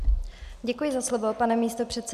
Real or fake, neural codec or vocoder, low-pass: real; none; 14.4 kHz